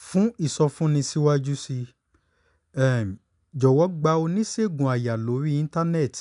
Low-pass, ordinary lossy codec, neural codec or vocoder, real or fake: 10.8 kHz; none; none; real